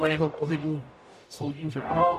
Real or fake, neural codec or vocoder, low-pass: fake; codec, 44.1 kHz, 0.9 kbps, DAC; 14.4 kHz